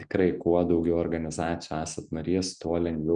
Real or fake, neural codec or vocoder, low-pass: real; none; 9.9 kHz